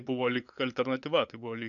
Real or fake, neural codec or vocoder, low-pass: fake; codec, 16 kHz, 8 kbps, FunCodec, trained on LibriTTS, 25 frames a second; 7.2 kHz